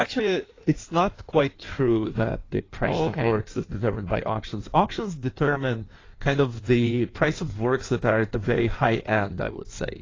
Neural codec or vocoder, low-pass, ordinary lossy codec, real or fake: codec, 16 kHz in and 24 kHz out, 1.1 kbps, FireRedTTS-2 codec; 7.2 kHz; AAC, 32 kbps; fake